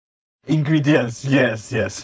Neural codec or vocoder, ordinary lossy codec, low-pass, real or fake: codec, 16 kHz, 4.8 kbps, FACodec; none; none; fake